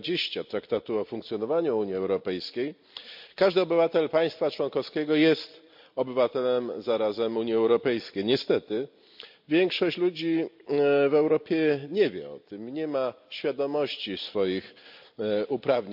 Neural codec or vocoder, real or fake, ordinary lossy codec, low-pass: vocoder, 44.1 kHz, 128 mel bands every 256 samples, BigVGAN v2; fake; MP3, 48 kbps; 5.4 kHz